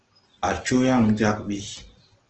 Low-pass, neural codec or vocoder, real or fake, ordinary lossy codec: 7.2 kHz; none; real; Opus, 16 kbps